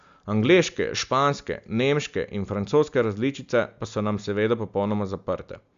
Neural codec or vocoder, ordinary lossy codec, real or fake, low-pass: none; Opus, 64 kbps; real; 7.2 kHz